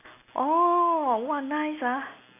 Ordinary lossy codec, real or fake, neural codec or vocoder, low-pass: AAC, 24 kbps; real; none; 3.6 kHz